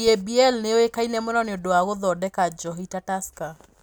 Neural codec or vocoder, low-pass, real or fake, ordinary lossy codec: none; none; real; none